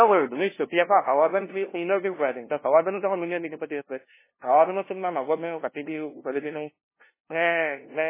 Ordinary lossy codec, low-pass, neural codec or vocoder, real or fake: MP3, 16 kbps; 3.6 kHz; codec, 16 kHz, 0.5 kbps, FunCodec, trained on LibriTTS, 25 frames a second; fake